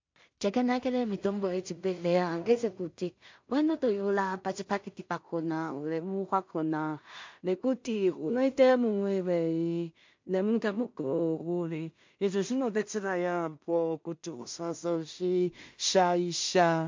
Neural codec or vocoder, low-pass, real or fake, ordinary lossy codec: codec, 16 kHz in and 24 kHz out, 0.4 kbps, LongCat-Audio-Codec, two codebook decoder; 7.2 kHz; fake; MP3, 48 kbps